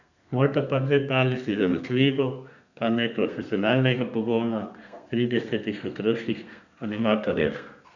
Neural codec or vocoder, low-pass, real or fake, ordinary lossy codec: codec, 32 kHz, 1.9 kbps, SNAC; 7.2 kHz; fake; none